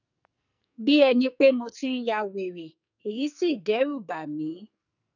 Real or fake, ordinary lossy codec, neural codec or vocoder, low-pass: fake; none; codec, 44.1 kHz, 2.6 kbps, SNAC; 7.2 kHz